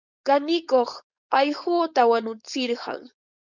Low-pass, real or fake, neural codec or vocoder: 7.2 kHz; fake; codec, 16 kHz, 4.8 kbps, FACodec